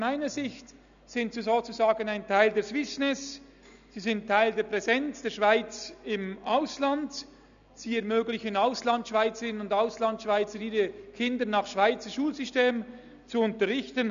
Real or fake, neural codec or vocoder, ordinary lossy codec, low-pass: real; none; AAC, 96 kbps; 7.2 kHz